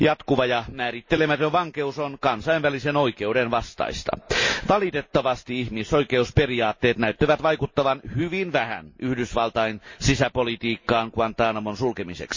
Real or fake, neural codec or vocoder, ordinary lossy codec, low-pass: real; none; MP3, 32 kbps; 7.2 kHz